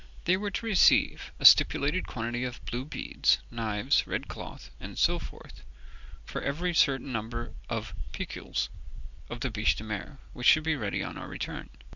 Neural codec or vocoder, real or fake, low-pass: none; real; 7.2 kHz